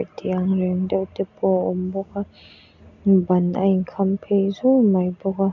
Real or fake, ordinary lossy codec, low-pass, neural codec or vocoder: real; none; 7.2 kHz; none